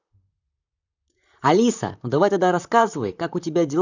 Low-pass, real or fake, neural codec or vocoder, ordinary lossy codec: 7.2 kHz; fake; vocoder, 44.1 kHz, 128 mel bands, Pupu-Vocoder; none